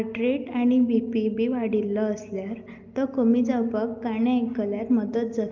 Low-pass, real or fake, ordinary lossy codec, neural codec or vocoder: 7.2 kHz; real; Opus, 32 kbps; none